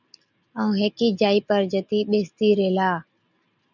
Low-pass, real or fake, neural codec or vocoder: 7.2 kHz; real; none